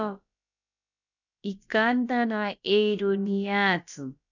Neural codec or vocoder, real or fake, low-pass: codec, 16 kHz, about 1 kbps, DyCAST, with the encoder's durations; fake; 7.2 kHz